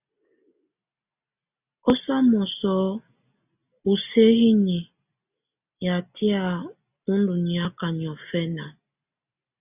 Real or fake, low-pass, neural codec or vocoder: real; 3.6 kHz; none